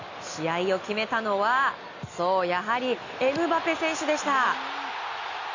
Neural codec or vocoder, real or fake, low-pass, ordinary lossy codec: none; real; 7.2 kHz; Opus, 64 kbps